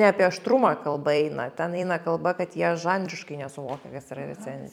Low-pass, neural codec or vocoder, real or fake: 19.8 kHz; vocoder, 44.1 kHz, 128 mel bands every 256 samples, BigVGAN v2; fake